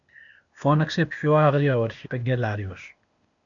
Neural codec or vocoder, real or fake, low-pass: codec, 16 kHz, 0.8 kbps, ZipCodec; fake; 7.2 kHz